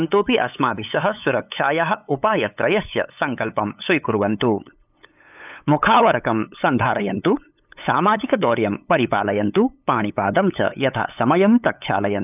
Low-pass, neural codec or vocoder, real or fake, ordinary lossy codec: 3.6 kHz; codec, 16 kHz, 8 kbps, FunCodec, trained on LibriTTS, 25 frames a second; fake; none